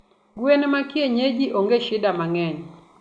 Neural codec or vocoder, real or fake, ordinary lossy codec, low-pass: none; real; Opus, 64 kbps; 9.9 kHz